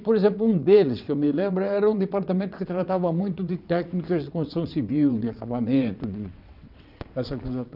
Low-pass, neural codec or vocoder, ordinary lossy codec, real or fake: 5.4 kHz; vocoder, 22.05 kHz, 80 mel bands, WaveNeXt; none; fake